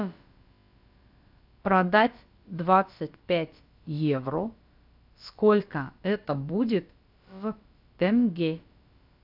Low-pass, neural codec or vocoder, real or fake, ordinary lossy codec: 5.4 kHz; codec, 16 kHz, about 1 kbps, DyCAST, with the encoder's durations; fake; AAC, 48 kbps